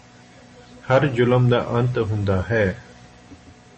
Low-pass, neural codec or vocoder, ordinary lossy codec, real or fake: 10.8 kHz; none; MP3, 32 kbps; real